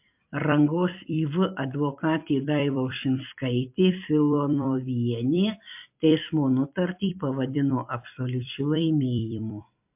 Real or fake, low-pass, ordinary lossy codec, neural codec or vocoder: fake; 3.6 kHz; MP3, 32 kbps; vocoder, 44.1 kHz, 128 mel bands every 256 samples, BigVGAN v2